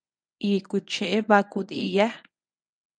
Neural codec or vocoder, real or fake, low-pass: codec, 24 kHz, 0.9 kbps, WavTokenizer, medium speech release version 1; fake; 9.9 kHz